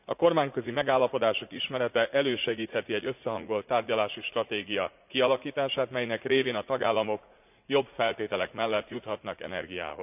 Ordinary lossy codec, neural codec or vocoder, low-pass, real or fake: none; vocoder, 44.1 kHz, 80 mel bands, Vocos; 3.6 kHz; fake